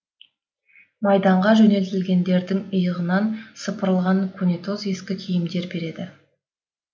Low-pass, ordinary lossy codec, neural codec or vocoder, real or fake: none; none; none; real